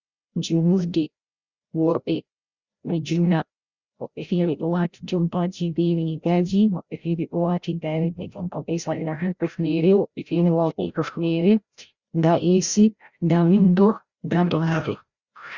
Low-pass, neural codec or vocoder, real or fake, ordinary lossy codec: 7.2 kHz; codec, 16 kHz, 0.5 kbps, FreqCodec, larger model; fake; Opus, 64 kbps